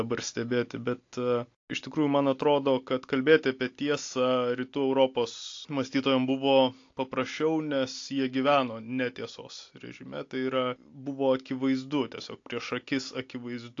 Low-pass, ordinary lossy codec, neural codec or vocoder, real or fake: 7.2 kHz; AAC, 48 kbps; none; real